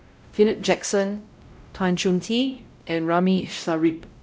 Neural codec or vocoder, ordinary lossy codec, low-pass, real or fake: codec, 16 kHz, 0.5 kbps, X-Codec, WavLM features, trained on Multilingual LibriSpeech; none; none; fake